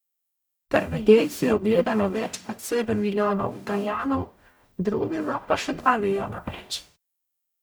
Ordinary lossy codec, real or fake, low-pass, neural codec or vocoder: none; fake; none; codec, 44.1 kHz, 0.9 kbps, DAC